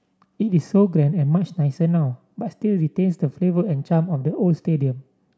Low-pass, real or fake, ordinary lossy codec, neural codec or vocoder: none; real; none; none